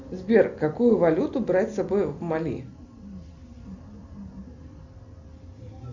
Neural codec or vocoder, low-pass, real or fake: none; 7.2 kHz; real